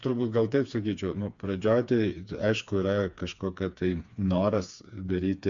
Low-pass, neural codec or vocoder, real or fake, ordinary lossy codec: 7.2 kHz; codec, 16 kHz, 4 kbps, FreqCodec, smaller model; fake; MP3, 64 kbps